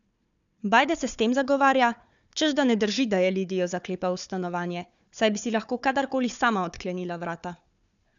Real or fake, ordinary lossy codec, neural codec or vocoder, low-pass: fake; none; codec, 16 kHz, 4 kbps, FunCodec, trained on Chinese and English, 50 frames a second; 7.2 kHz